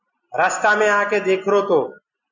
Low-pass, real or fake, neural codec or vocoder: 7.2 kHz; real; none